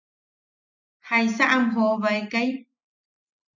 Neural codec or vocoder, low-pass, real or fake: none; 7.2 kHz; real